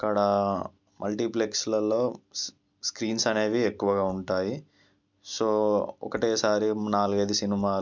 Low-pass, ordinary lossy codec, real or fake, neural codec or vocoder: 7.2 kHz; MP3, 64 kbps; real; none